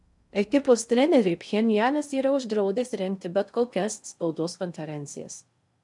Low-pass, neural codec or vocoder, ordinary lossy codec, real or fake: 10.8 kHz; codec, 16 kHz in and 24 kHz out, 0.6 kbps, FocalCodec, streaming, 2048 codes; MP3, 96 kbps; fake